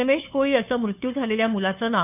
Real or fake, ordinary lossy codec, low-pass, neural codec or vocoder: fake; none; 3.6 kHz; codec, 16 kHz, 2 kbps, FunCodec, trained on Chinese and English, 25 frames a second